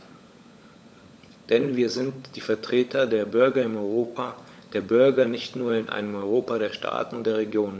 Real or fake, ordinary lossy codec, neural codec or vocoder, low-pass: fake; none; codec, 16 kHz, 16 kbps, FunCodec, trained on LibriTTS, 50 frames a second; none